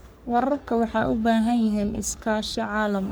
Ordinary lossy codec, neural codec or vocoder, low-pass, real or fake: none; codec, 44.1 kHz, 3.4 kbps, Pupu-Codec; none; fake